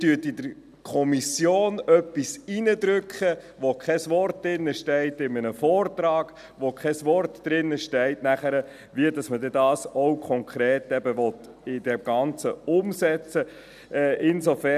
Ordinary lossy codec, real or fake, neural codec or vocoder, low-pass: none; real; none; 14.4 kHz